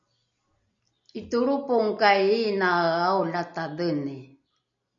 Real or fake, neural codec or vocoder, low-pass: real; none; 7.2 kHz